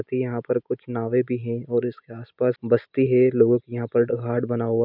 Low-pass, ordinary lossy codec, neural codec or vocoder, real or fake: 5.4 kHz; none; none; real